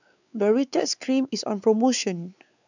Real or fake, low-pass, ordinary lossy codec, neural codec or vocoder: fake; 7.2 kHz; none; codec, 16 kHz, 4 kbps, X-Codec, WavLM features, trained on Multilingual LibriSpeech